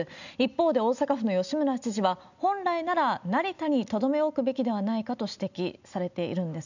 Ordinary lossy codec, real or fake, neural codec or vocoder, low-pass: none; real; none; 7.2 kHz